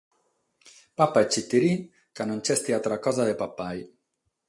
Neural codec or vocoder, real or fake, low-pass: none; real; 10.8 kHz